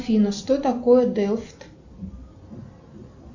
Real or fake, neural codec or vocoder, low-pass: real; none; 7.2 kHz